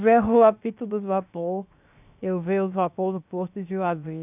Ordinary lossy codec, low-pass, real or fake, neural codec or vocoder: none; 3.6 kHz; fake; codec, 16 kHz, 0.7 kbps, FocalCodec